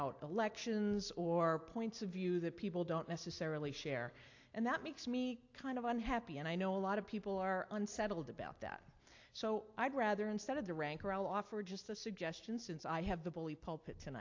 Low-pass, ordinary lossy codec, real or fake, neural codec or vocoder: 7.2 kHz; AAC, 48 kbps; real; none